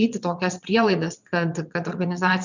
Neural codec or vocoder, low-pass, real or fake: vocoder, 22.05 kHz, 80 mel bands, WaveNeXt; 7.2 kHz; fake